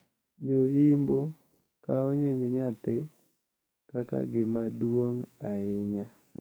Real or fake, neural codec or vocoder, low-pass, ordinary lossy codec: fake; codec, 44.1 kHz, 2.6 kbps, SNAC; none; none